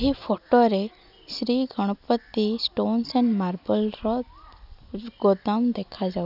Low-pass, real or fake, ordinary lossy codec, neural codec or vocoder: 5.4 kHz; real; none; none